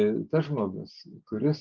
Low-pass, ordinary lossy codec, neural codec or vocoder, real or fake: 7.2 kHz; Opus, 32 kbps; none; real